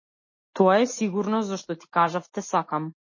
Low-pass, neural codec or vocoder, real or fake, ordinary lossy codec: 7.2 kHz; none; real; MP3, 32 kbps